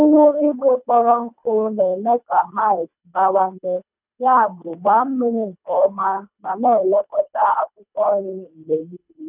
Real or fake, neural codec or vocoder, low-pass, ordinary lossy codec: fake; codec, 24 kHz, 1.5 kbps, HILCodec; 3.6 kHz; none